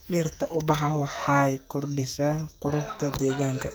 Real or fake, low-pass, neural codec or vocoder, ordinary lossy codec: fake; none; codec, 44.1 kHz, 2.6 kbps, SNAC; none